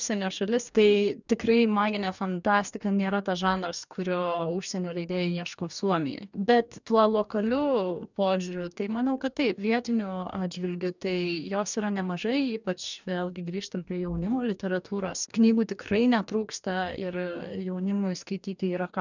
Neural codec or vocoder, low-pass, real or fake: codec, 44.1 kHz, 2.6 kbps, DAC; 7.2 kHz; fake